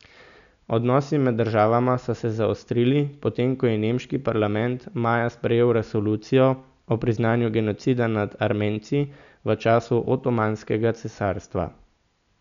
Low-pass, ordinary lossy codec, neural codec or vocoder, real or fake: 7.2 kHz; none; none; real